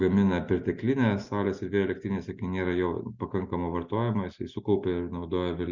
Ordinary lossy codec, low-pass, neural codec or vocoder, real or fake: Opus, 64 kbps; 7.2 kHz; none; real